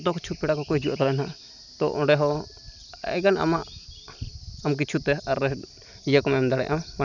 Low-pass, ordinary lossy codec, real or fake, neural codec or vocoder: 7.2 kHz; none; real; none